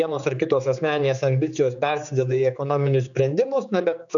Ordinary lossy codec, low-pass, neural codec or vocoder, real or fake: MP3, 96 kbps; 7.2 kHz; codec, 16 kHz, 4 kbps, X-Codec, HuBERT features, trained on general audio; fake